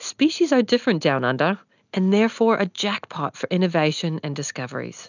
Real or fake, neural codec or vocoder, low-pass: real; none; 7.2 kHz